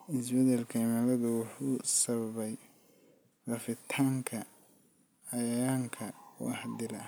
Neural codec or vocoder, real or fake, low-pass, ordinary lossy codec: none; real; none; none